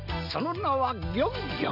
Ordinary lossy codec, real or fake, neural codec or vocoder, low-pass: none; real; none; 5.4 kHz